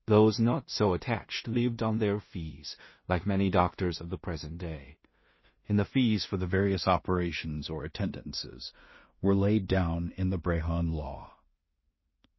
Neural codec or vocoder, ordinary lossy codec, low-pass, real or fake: codec, 16 kHz in and 24 kHz out, 0.4 kbps, LongCat-Audio-Codec, two codebook decoder; MP3, 24 kbps; 7.2 kHz; fake